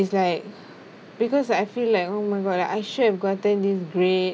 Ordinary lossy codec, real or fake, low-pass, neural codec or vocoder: none; real; none; none